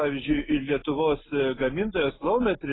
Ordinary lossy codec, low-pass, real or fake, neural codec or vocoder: AAC, 16 kbps; 7.2 kHz; real; none